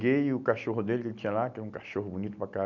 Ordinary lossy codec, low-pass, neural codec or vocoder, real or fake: none; 7.2 kHz; none; real